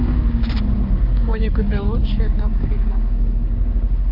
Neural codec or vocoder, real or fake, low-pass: codec, 16 kHz, 4 kbps, X-Codec, HuBERT features, trained on balanced general audio; fake; 5.4 kHz